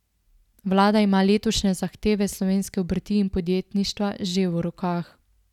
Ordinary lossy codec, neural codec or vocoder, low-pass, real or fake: none; none; 19.8 kHz; real